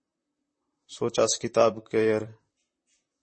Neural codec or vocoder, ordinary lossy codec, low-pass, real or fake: vocoder, 44.1 kHz, 128 mel bands, Pupu-Vocoder; MP3, 32 kbps; 9.9 kHz; fake